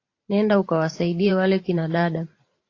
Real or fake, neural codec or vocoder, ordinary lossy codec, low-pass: fake; vocoder, 44.1 kHz, 128 mel bands every 512 samples, BigVGAN v2; AAC, 32 kbps; 7.2 kHz